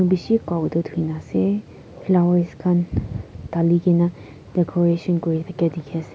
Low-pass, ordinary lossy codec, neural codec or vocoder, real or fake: none; none; none; real